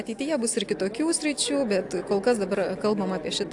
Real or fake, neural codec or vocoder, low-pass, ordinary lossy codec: real; none; 10.8 kHz; AAC, 64 kbps